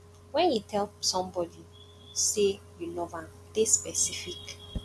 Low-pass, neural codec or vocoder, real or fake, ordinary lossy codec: none; none; real; none